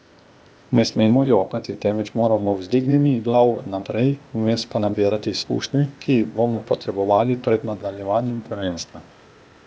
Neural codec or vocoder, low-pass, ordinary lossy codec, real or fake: codec, 16 kHz, 0.8 kbps, ZipCodec; none; none; fake